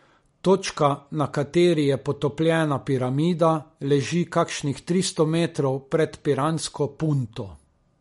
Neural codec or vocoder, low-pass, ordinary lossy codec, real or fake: none; 19.8 kHz; MP3, 48 kbps; real